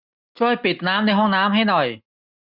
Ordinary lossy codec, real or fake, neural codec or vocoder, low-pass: none; real; none; 5.4 kHz